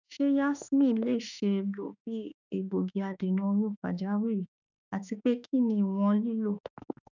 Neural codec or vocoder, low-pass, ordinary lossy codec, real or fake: autoencoder, 48 kHz, 32 numbers a frame, DAC-VAE, trained on Japanese speech; 7.2 kHz; none; fake